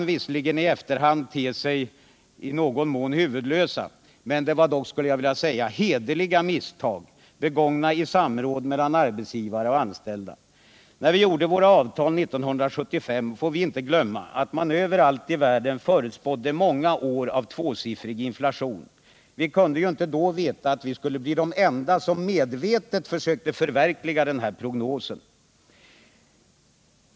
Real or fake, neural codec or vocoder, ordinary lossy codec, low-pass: real; none; none; none